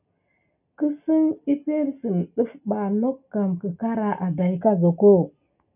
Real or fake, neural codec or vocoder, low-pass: real; none; 3.6 kHz